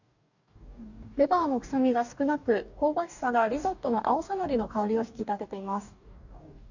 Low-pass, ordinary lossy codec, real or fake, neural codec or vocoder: 7.2 kHz; none; fake; codec, 44.1 kHz, 2.6 kbps, DAC